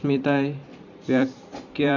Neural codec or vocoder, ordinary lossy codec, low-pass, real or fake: vocoder, 44.1 kHz, 128 mel bands every 512 samples, BigVGAN v2; none; 7.2 kHz; fake